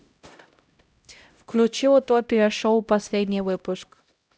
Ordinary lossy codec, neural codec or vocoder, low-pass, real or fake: none; codec, 16 kHz, 0.5 kbps, X-Codec, HuBERT features, trained on LibriSpeech; none; fake